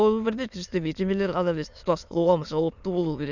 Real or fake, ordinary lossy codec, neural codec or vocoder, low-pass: fake; none; autoencoder, 22.05 kHz, a latent of 192 numbers a frame, VITS, trained on many speakers; 7.2 kHz